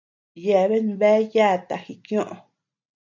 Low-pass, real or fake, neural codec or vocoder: 7.2 kHz; real; none